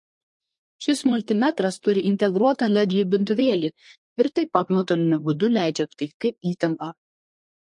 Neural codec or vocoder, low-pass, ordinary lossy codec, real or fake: codec, 24 kHz, 1 kbps, SNAC; 10.8 kHz; MP3, 48 kbps; fake